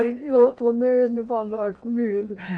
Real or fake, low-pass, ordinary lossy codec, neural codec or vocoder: fake; 9.9 kHz; none; codec, 16 kHz in and 24 kHz out, 0.8 kbps, FocalCodec, streaming, 65536 codes